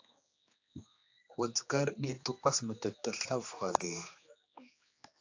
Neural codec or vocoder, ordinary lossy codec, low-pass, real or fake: codec, 16 kHz, 2 kbps, X-Codec, HuBERT features, trained on general audio; AAC, 48 kbps; 7.2 kHz; fake